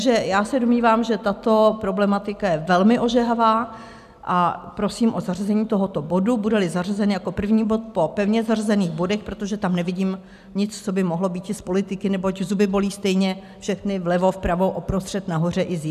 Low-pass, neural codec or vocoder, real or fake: 14.4 kHz; none; real